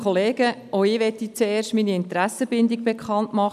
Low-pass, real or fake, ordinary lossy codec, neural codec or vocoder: 14.4 kHz; real; none; none